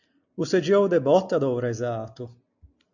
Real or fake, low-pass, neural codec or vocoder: real; 7.2 kHz; none